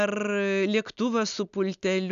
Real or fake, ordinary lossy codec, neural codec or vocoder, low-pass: real; MP3, 96 kbps; none; 7.2 kHz